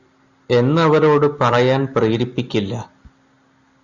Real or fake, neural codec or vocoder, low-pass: real; none; 7.2 kHz